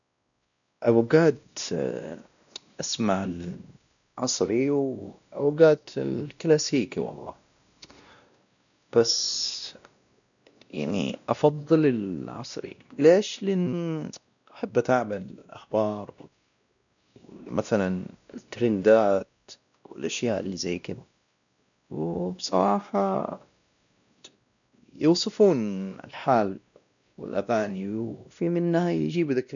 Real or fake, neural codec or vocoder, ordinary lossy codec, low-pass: fake; codec, 16 kHz, 1 kbps, X-Codec, WavLM features, trained on Multilingual LibriSpeech; none; 7.2 kHz